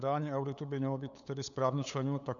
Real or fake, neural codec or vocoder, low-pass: fake; codec, 16 kHz, 8 kbps, FunCodec, trained on LibriTTS, 25 frames a second; 7.2 kHz